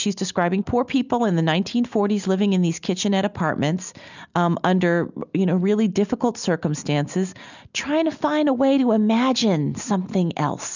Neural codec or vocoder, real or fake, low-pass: none; real; 7.2 kHz